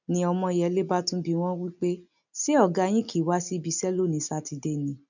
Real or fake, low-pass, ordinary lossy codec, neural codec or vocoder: real; 7.2 kHz; none; none